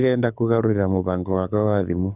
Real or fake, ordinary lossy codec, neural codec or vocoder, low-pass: fake; none; codec, 24 kHz, 6 kbps, HILCodec; 3.6 kHz